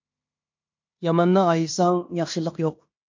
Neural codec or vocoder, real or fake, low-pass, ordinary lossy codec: codec, 16 kHz in and 24 kHz out, 0.9 kbps, LongCat-Audio-Codec, fine tuned four codebook decoder; fake; 7.2 kHz; MP3, 48 kbps